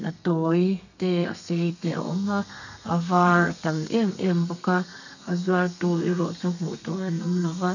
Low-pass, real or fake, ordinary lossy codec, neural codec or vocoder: 7.2 kHz; fake; none; codec, 32 kHz, 1.9 kbps, SNAC